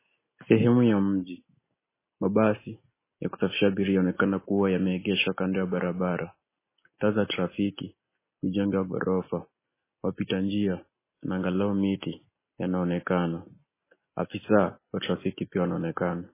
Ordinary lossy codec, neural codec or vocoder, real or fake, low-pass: MP3, 16 kbps; none; real; 3.6 kHz